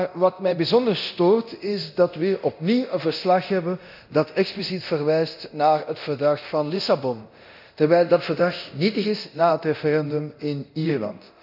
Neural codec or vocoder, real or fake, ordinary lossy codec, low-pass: codec, 24 kHz, 0.9 kbps, DualCodec; fake; none; 5.4 kHz